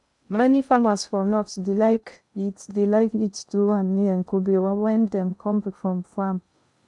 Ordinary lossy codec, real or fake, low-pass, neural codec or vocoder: none; fake; 10.8 kHz; codec, 16 kHz in and 24 kHz out, 0.6 kbps, FocalCodec, streaming, 2048 codes